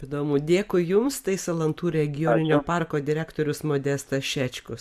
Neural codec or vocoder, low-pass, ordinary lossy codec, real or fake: vocoder, 44.1 kHz, 128 mel bands every 256 samples, BigVGAN v2; 14.4 kHz; MP3, 96 kbps; fake